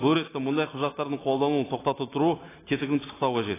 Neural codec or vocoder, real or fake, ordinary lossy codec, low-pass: none; real; AAC, 16 kbps; 3.6 kHz